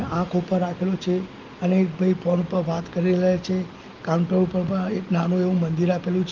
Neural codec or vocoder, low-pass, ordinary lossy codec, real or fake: none; 7.2 kHz; Opus, 32 kbps; real